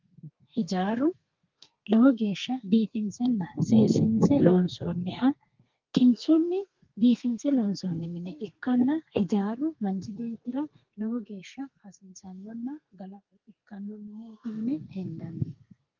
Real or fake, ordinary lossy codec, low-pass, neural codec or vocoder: fake; Opus, 24 kbps; 7.2 kHz; codec, 32 kHz, 1.9 kbps, SNAC